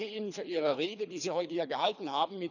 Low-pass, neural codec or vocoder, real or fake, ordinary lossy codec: 7.2 kHz; codec, 24 kHz, 3 kbps, HILCodec; fake; none